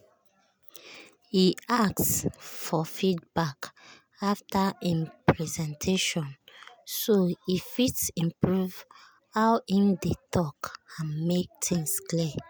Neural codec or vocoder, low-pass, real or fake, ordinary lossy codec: none; none; real; none